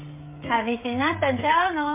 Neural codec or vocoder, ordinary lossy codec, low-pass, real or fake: codec, 16 kHz, 16 kbps, FreqCodec, smaller model; MP3, 32 kbps; 3.6 kHz; fake